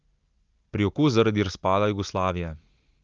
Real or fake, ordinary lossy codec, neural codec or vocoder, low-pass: real; Opus, 24 kbps; none; 7.2 kHz